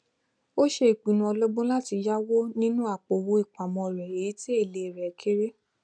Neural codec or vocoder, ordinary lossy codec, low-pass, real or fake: none; none; 9.9 kHz; real